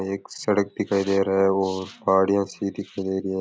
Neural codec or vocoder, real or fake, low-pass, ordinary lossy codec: none; real; none; none